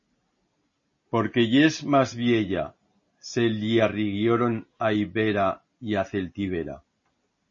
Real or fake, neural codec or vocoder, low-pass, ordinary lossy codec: real; none; 7.2 kHz; MP3, 32 kbps